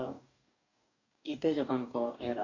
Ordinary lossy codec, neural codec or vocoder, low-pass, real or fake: none; codec, 44.1 kHz, 2.6 kbps, DAC; 7.2 kHz; fake